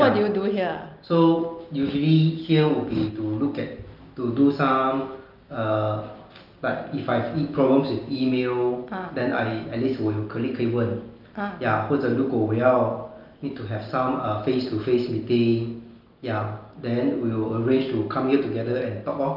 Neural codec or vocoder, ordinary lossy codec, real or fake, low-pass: none; Opus, 24 kbps; real; 5.4 kHz